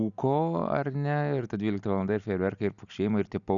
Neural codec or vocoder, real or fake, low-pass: none; real; 7.2 kHz